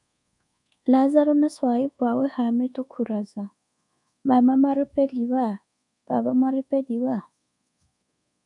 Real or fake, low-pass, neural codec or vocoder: fake; 10.8 kHz; codec, 24 kHz, 1.2 kbps, DualCodec